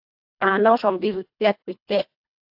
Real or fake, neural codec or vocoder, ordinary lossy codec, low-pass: fake; codec, 24 kHz, 1.5 kbps, HILCodec; AAC, 48 kbps; 5.4 kHz